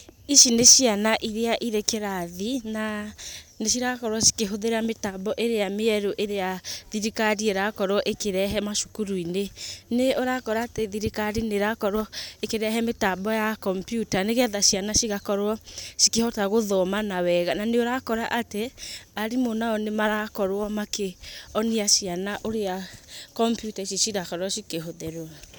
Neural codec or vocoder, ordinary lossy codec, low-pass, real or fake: none; none; none; real